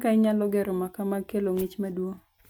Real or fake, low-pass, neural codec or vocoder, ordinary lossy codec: real; none; none; none